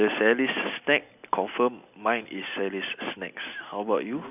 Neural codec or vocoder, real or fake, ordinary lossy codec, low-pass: none; real; none; 3.6 kHz